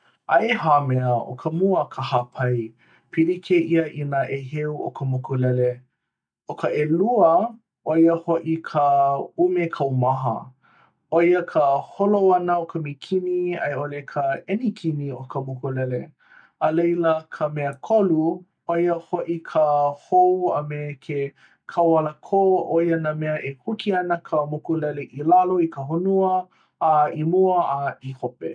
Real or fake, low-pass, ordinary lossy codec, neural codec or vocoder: real; 9.9 kHz; none; none